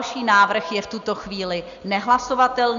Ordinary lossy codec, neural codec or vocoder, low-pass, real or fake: Opus, 64 kbps; none; 7.2 kHz; real